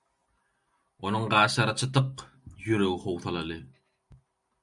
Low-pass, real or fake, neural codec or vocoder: 10.8 kHz; real; none